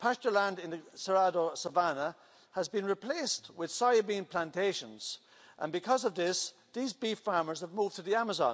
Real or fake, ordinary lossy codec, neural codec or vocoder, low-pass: real; none; none; none